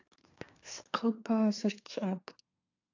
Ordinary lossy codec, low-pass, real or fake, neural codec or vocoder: AAC, 48 kbps; 7.2 kHz; fake; codec, 24 kHz, 1 kbps, SNAC